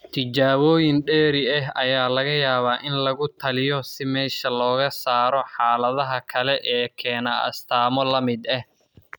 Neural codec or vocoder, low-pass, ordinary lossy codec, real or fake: none; none; none; real